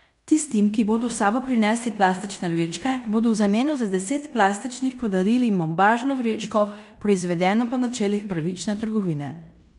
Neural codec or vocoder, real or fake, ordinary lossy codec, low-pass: codec, 16 kHz in and 24 kHz out, 0.9 kbps, LongCat-Audio-Codec, fine tuned four codebook decoder; fake; none; 10.8 kHz